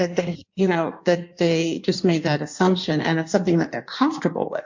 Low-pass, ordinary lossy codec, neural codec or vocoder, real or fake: 7.2 kHz; MP3, 48 kbps; codec, 16 kHz in and 24 kHz out, 1.1 kbps, FireRedTTS-2 codec; fake